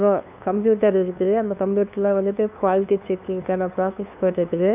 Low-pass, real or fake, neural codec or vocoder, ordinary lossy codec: 3.6 kHz; fake; codec, 16 kHz, 2 kbps, FunCodec, trained on LibriTTS, 25 frames a second; none